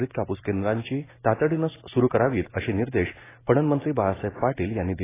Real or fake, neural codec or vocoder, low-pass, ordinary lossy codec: real; none; 3.6 kHz; AAC, 16 kbps